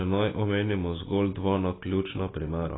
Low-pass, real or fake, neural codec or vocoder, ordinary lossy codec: 7.2 kHz; fake; vocoder, 44.1 kHz, 128 mel bands every 256 samples, BigVGAN v2; AAC, 16 kbps